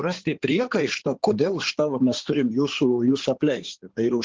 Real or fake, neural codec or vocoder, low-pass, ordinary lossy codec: fake; codec, 16 kHz in and 24 kHz out, 2.2 kbps, FireRedTTS-2 codec; 7.2 kHz; Opus, 16 kbps